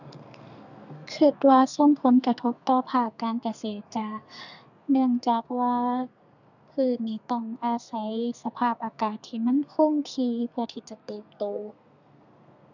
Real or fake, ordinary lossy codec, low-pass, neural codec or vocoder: fake; none; 7.2 kHz; codec, 32 kHz, 1.9 kbps, SNAC